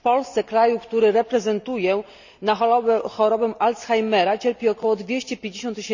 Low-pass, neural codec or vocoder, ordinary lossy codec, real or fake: 7.2 kHz; none; none; real